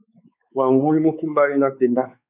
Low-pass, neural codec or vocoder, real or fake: 3.6 kHz; codec, 16 kHz, 4 kbps, X-Codec, WavLM features, trained on Multilingual LibriSpeech; fake